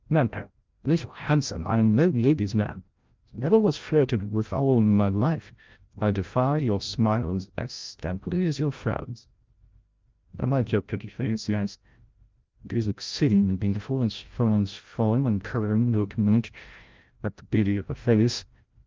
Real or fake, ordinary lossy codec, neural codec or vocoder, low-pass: fake; Opus, 32 kbps; codec, 16 kHz, 0.5 kbps, FreqCodec, larger model; 7.2 kHz